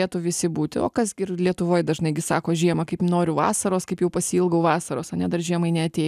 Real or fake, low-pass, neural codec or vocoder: real; 14.4 kHz; none